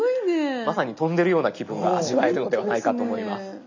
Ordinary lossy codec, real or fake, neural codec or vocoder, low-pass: none; real; none; 7.2 kHz